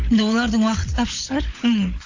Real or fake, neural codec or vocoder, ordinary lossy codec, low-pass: fake; codec, 16 kHz, 16 kbps, FunCodec, trained on LibriTTS, 50 frames a second; AAC, 48 kbps; 7.2 kHz